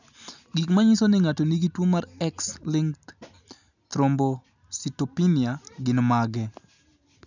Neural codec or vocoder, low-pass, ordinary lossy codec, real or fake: none; 7.2 kHz; none; real